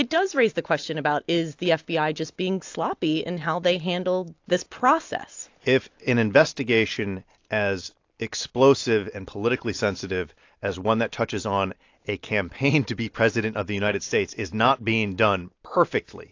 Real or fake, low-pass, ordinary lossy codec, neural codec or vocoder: real; 7.2 kHz; AAC, 48 kbps; none